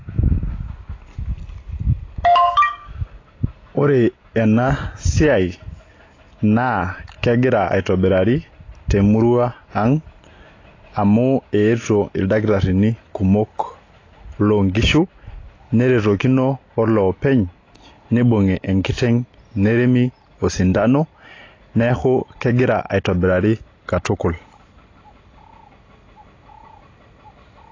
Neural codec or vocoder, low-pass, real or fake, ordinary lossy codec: none; 7.2 kHz; real; AAC, 32 kbps